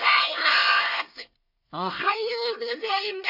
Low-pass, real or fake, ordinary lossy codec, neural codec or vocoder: 5.4 kHz; fake; none; codec, 24 kHz, 1 kbps, SNAC